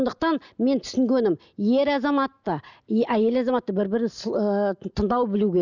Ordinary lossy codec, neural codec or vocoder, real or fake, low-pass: none; none; real; 7.2 kHz